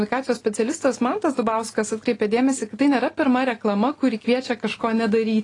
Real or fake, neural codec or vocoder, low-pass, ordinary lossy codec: real; none; 10.8 kHz; AAC, 32 kbps